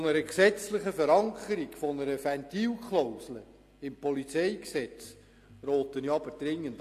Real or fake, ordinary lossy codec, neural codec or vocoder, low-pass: real; AAC, 64 kbps; none; 14.4 kHz